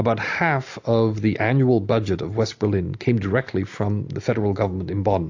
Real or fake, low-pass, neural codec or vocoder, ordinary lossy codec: real; 7.2 kHz; none; AAC, 48 kbps